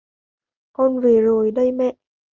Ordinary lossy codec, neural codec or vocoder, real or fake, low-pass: Opus, 32 kbps; none; real; 7.2 kHz